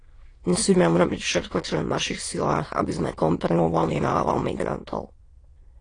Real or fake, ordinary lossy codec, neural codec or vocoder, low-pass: fake; AAC, 32 kbps; autoencoder, 22.05 kHz, a latent of 192 numbers a frame, VITS, trained on many speakers; 9.9 kHz